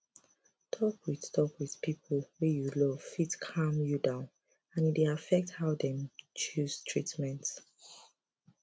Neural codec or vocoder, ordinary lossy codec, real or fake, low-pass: none; none; real; none